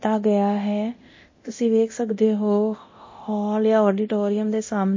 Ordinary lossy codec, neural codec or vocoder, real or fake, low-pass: MP3, 32 kbps; codec, 24 kHz, 0.9 kbps, DualCodec; fake; 7.2 kHz